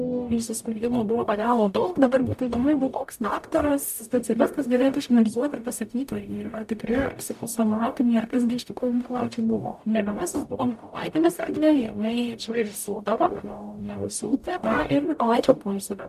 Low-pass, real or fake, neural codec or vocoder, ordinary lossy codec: 14.4 kHz; fake; codec, 44.1 kHz, 0.9 kbps, DAC; MP3, 96 kbps